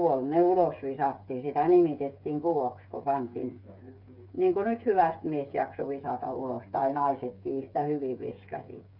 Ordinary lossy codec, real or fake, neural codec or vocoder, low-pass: none; fake; codec, 16 kHz, 8 kbps, FreqCodec, smaller model; 5.4 kHz